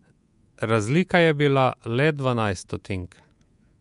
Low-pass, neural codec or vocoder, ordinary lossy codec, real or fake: 10.8 kHz; codec, 24 kHz, 3.1 kbps, DualCodec; MP3, 64 kbps; fake